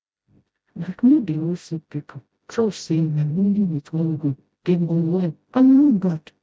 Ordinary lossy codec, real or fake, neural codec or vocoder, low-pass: none; fake; codec, 16 kHz, 0.5 kbps, FreqCodec, smaller model; none